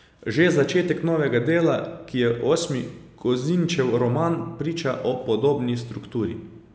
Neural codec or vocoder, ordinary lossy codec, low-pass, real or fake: none; none; none; real